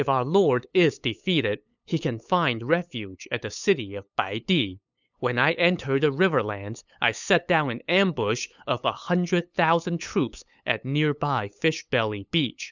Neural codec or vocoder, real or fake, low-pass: codec, 16 kHz, 8 kbps, FunCodec, trained on LibriTTS, 25 frames a second; fake; 7.2 kHz